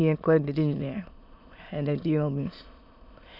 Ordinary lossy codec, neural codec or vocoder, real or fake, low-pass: none; autoencoder, 22.05 kHz, a latent of 192 numbers a frame, VITS, trained on many speakers; fake; 5.4 kHz